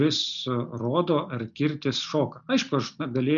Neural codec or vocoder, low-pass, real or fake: none; 7.2 kHz; real